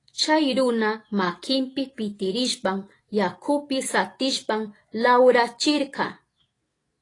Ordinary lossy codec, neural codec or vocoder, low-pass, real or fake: AAC, 32 kbps; autoencoder, 48 kHz, 128 numbers a frame, DAC-VAE, trained on Japanese speech; 10.8 kHz; fake